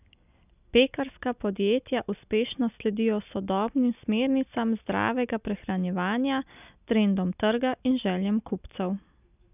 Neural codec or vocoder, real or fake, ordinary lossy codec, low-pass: none; real; none; 3.6 kHz